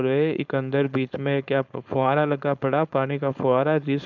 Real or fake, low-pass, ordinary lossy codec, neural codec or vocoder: fake; 7.2 kHz; none; codec, 16 kHz, 4.8 kbps, FACodec